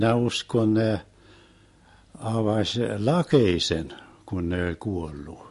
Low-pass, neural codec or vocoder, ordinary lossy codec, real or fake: 14.4 kHz; none; MP3, 48 kbps; real